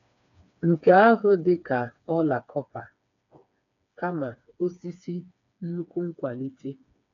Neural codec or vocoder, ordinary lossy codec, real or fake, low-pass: codec, 16 kHz, 4 kbps, FreqCodec, smaller model; none; fake; 7.2 kHz